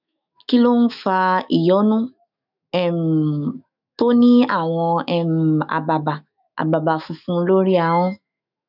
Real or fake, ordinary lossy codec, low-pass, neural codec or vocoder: fake; none; 5.4 kHz; autoencoder, 48 kHz, 128 numbers a frame, DAC-VAE, trained on Japanese speech